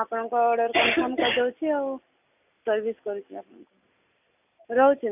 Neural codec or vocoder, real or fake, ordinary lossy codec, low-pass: none; real; none; 3.6 kHz